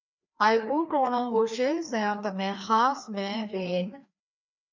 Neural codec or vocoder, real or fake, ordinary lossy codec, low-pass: codec, 16 kHz, 2 kbps, FreqCodec, larger model; fake; MP3, 64 kbps; 7.2 kHz